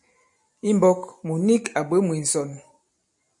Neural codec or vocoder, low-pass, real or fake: none; 10.8 kHz; real